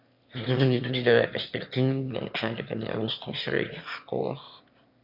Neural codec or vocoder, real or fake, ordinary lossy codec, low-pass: autoencoder, 22.05 kHz, a latent of 192 numbers a frame, VITS, trained on one speaker; fake; MP3, 48 kbps; 5.4 kHz